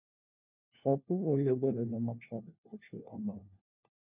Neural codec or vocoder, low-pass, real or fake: codec, 16 kHz, 1 kbps, FunCodec, trained on LibriTTS, 50 frames a second; 3.6 kHz; fake